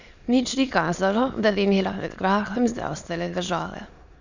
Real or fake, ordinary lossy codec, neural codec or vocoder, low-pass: fake; none; autoencoder, 22.05 kHz, a latent of 192 numbers a frame, VITS, trained on many speakers; 7.2 kHz